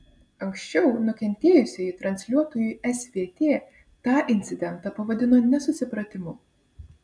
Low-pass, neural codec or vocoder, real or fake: 9.9 kHz; none; real